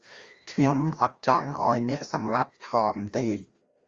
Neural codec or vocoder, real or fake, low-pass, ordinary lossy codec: codec, 16 kHz, 1 kbps, FreqCodec, larger model; fake; 7.2 kHz; Opus, 32 kbps